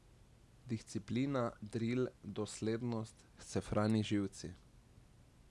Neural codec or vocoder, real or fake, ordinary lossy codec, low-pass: none; real; none; none